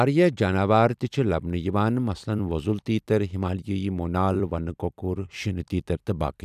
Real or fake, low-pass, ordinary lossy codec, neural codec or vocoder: fake; 14.4 kHz; none; vocoder, 44.1 kHz, 128 mel bands every 256 samples, BigVGAN v2